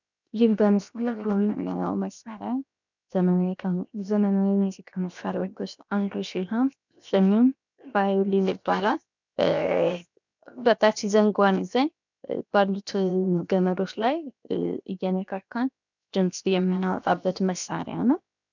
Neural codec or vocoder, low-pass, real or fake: codec, 16 kHz, 0.7 kbps, FocalCodec; 7.2 kHz; fake